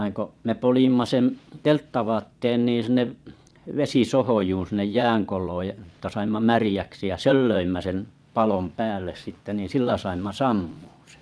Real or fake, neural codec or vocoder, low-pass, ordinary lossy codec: fake; vocoder, 22.05 kHz, 80 mel bands, Vocos; none; none